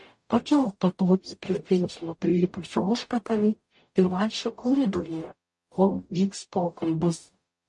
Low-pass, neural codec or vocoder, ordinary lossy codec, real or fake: 10.8 kHz; codec, 44.1 kHz, 0.9 kbps, DAC; MP3, 48 kbps; fake